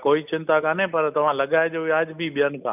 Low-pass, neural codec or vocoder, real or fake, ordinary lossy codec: 3.6 kHz; none; real; none